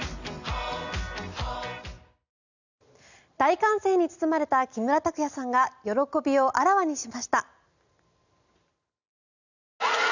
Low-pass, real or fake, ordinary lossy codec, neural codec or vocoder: 7.2 kHz; real; none; none